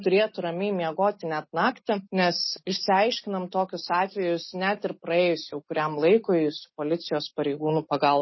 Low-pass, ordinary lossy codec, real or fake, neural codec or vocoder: 7.2 kHz; MP3, 24 kbps; real; none